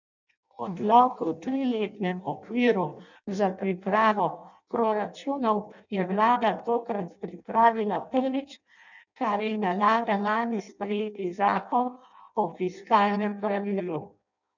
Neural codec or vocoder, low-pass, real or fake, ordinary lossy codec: codec, 16 kHz in and 24 kHz out, 0.6 kbps, FireRedTTS-2 codec; 7.2 kHz; fake; none